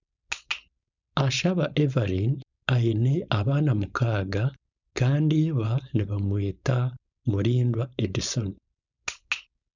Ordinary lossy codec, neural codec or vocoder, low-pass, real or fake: none; codec, 16 kHz, 4.8 kbps, FACodec; 7.2 kHz; fake